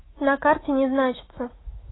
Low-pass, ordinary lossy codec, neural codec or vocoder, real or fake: 7.2 kHz; AAC, 16 kbps; autoencoder, 48 kHz, 128 numbers a frame, DAC-VAE, trained on Japanese speech; fake